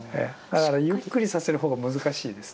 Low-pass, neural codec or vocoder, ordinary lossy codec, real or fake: none; none; none; real